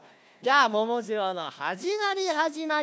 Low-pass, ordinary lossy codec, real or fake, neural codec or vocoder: none; none; fake; codec, 16 kHz, 1 kbps, FunCodec, trained on Chinese and English, 50 frames a second